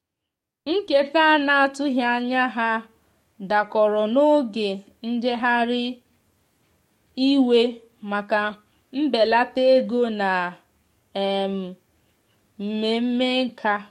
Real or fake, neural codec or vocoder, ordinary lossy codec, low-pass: fake; codec, 44.1 kHz, 7.8 kbps, DAC; MP3, 64 kbps; 19.8 kHz